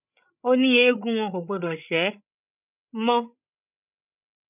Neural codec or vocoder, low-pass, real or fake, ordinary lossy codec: codec, 16 kHz, 16 kbps, FreqCodec, larger model; 3.6 kHz; fake; none